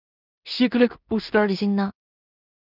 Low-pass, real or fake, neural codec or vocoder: 5.4 kHz; fake; codec, 16 kHz in and 24 kHz out, 0.4 kbps, LongCat-Audio-Codec, two codebook decoder